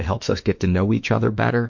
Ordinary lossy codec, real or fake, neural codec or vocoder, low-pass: MP3, 48 kbps; fake; autoencoder, 48 kHz, 32 numbers a frame, DAC-VAE, trained on Japanese speech; 7.2 kHz